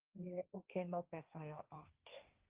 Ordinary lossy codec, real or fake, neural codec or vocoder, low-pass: Opus, 32 kbps; fake; codec, 16 kHz, 1.1 kbps, Voila-Tokenizer; 3.6 kHz